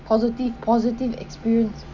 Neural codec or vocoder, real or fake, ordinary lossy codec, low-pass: none; real; none; 7.2 kHz